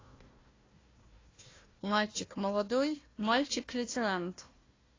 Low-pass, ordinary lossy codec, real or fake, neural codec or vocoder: 7.2 kHz; AAC, 32 kbps; fake; codec, 16 kHz, 1 kbps, FunCodec, trained on Chinese and English, 50 frames a second